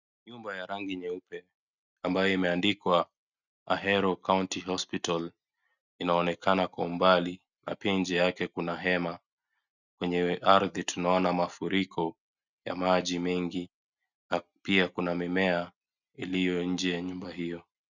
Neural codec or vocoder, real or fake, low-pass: none; real; 7.2 kHz